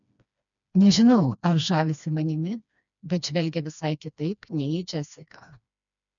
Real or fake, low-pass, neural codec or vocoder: fake; 7.2 kHz; codec, 16 kHz, 2 kbps, FreqCodec, smaller model